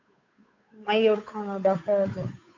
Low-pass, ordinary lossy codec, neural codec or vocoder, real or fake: 7.2 kHz; AAC, 48 kbps; codec, 16 kHz, 8 kbps, FunCodec, trained on Chinese and English, 25 frames a second; fake